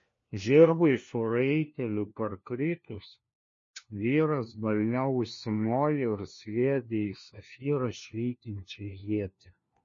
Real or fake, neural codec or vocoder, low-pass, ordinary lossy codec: fake; codec, 16 kHz, 1 kbps, FunCodec, trained on LibriTTS, 50 frames a second; 7.2 kHz; MP3, 32 kbps